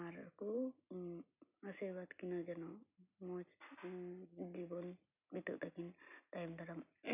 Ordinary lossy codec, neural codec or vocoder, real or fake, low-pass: none; none; real; 3.6 kHz